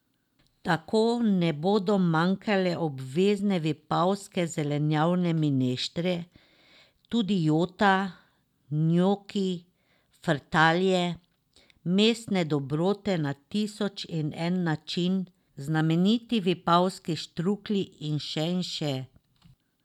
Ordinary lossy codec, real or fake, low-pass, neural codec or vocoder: none; real; 19.8 kHz; none